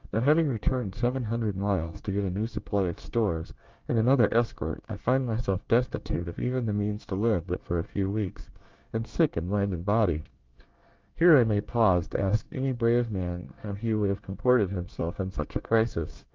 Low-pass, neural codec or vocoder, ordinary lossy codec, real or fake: 7.2 kHz; codec, 24 kHz, 1 kbps, SNAC; Opus, 32 kbps; fake